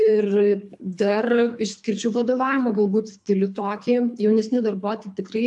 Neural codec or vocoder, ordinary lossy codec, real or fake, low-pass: codec, 24 kHz, 3 kbps, HILCodec; AAC, 64 kbps; fake; 10.8 kHz